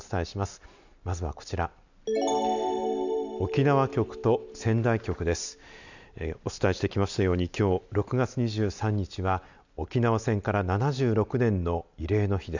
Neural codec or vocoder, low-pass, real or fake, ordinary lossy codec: none; 7.2 kHz; real; none